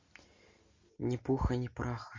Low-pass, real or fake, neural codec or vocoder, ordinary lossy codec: 7.2 kHz; real; none; MP3, 48 kbps